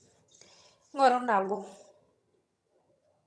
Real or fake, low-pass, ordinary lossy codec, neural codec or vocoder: fake; none; none; vocoder, 22.05 kHz, 80 mel bands, HiFi-GAN